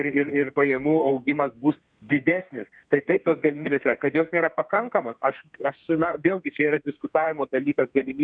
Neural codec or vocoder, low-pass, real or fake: codec, 32 kHz, 1.9 kbps, SNAC; 9.9 kHz; fake